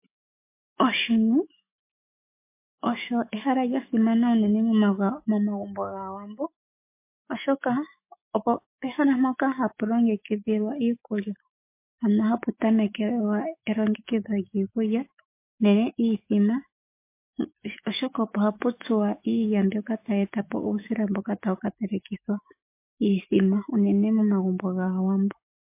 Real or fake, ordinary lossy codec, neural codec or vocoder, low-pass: fake; MP3, 24 kbps; autoencoder, 48 kHz, 128 numbers a frame, DAC-VAE, trained on Japanese speech; 3.6 kHz